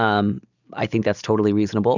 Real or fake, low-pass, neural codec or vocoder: real; 7.2 kHz; none